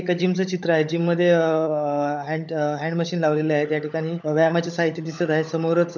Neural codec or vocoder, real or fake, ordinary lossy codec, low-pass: codec, 16 kHz, 16 kbps, FunCodec, trained on LibriTTS, 50 frames a second; fake; none; 7.2 kHz